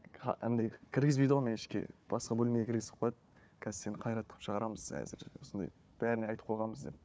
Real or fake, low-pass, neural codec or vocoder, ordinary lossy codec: fake; none; codec, 16 kHz, 4 kbps, FunCodec, trained on Chinese and English, 50 frames a second; none